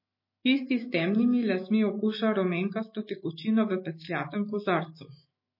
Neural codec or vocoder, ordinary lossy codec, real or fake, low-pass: autoencoder, 48 kHz, 128 numbers a frame, DAC-VAE, trained on Japanese speech; MP3, 24 kbps; fake; 5.4 kHz